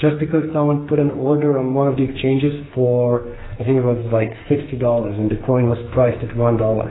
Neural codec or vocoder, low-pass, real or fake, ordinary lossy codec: codec, 44.1 kHz, 2.6 kbps, SNAC; 7.2 kHz; fake; AAC, 16 kbps